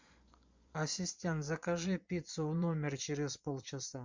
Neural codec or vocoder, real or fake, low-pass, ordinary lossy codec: vocoder, 24 kHz, 100 mel bands, Vocos; fake; 7.2 kHz; MP3, 64 kbps